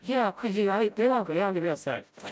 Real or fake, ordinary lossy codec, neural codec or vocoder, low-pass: fake; none; codec, 16 kHz, 0.5 kbps, FreqCodec, smaller model; none